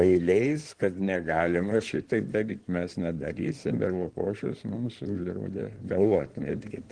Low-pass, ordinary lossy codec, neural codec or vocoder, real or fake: 9.9 kHz; Opus, 16 kbps; codec, 16 kHz in and 24 kHz out, 2.2 kbps, FireRedTTS-2 codec; fake